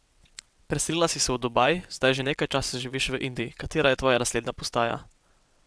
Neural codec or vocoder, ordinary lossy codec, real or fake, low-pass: vocoder, 22.05 kHz, 80 mel bands, WaveNeXt; none; fake; none